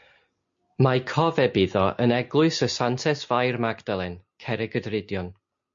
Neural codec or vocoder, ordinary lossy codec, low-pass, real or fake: none; MP3, 48 kbps; 7.2 kHz; real